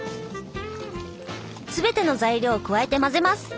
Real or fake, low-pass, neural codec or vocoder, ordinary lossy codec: real; none; none; none